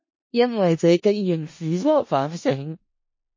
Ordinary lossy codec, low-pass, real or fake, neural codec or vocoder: MP3, 32 kbps; 7.2 kHz; fake; codec, 16 kHz in and 24 kHz out, 0.4 kbps, LongCat-Audio-Codec, four codebook decoder